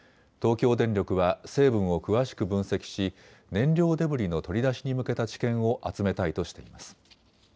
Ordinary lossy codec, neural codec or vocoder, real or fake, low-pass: none; none; real; none